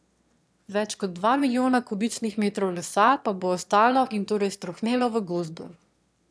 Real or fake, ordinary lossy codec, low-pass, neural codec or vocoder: fake; none; none; autoencoder, 22.05 kHz, a latent of 192 numbers a frame, VITS, trained on one speaker